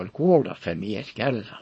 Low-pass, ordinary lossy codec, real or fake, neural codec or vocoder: 10.8 kHz; MP3, 32 kbps; fake; codec, 24 kHz, 0.9 kbps, WavTokenizer, small release